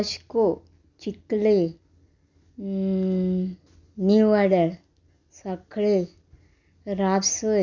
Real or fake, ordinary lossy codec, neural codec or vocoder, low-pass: real; none; none; 7.2 kHz